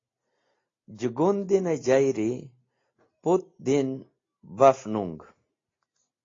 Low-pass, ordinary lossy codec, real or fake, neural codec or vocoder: 7.2 kHz; AAC, 32 kbps; real; none